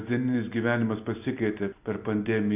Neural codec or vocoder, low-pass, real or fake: none; 3.6 kHz; real